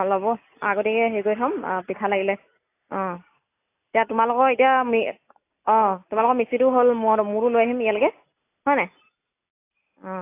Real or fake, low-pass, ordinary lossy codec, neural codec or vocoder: real; 3.6 kHz; AAC, 24 kbps; none